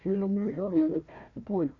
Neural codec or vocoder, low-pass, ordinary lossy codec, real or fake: codec, 16 kHz, 1 kbps, FunCodec, trained on Chinese and English, 50 frames a second; 7.2 kHz; none; fake